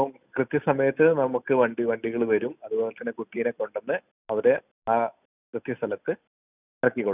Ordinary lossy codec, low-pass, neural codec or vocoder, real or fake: none; 3.6 kHz; none; real